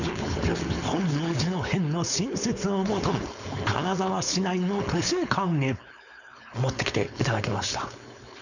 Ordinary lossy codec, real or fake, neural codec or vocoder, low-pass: none; fake; codec, 16 kHz, 4.8 kbps, FACodec; 7.2 kHz